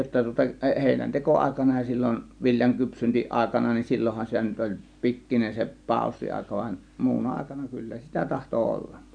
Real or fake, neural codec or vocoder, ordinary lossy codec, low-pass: real; none; none; 9.9 kHz